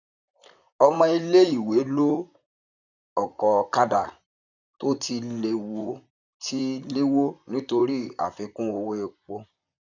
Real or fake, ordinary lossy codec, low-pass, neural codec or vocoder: fake; none; 7.2 kHz; vocoder, 44.1 kHz, 128 mel bands, Pupu-Vocoder